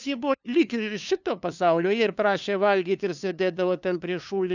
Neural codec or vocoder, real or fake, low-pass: codec, 16 kHz, 2 kbps, FunCodec, trained on LibriTTS, 25 frames a second; fake; 7.2 kHz